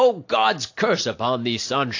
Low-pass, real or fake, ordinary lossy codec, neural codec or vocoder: 7.2 kHz; real; AAC, 48 kbps; none